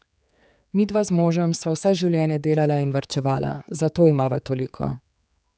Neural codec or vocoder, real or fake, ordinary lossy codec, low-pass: codec, 16 kHz, 4 kbps, X-Codec, HuBERT features, trained on general audio; fake; none; none